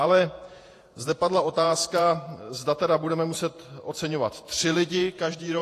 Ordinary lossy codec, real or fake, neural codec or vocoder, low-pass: AAC, 48 kbps; fake; vocoder, 48 kHz, 128 mel bands, Vocos; 14.4 kHz